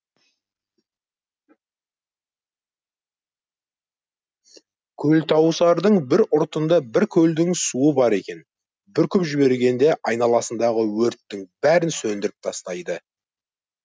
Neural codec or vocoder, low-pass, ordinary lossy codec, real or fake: none; none; none; real